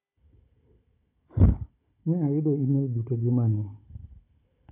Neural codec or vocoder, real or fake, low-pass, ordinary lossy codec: codec, 16 kHz, 16 kbps, FunCodec, trained on Chinese and English, 50 frames a second; fake; 3.6 kHz; AAC, 24 kbps